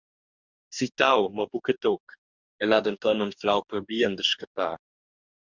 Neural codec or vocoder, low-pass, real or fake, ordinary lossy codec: codec, 44.1 kHz, 2.6 kbps, DAC; 7.2 kHz; fake; Opus, 64 kbps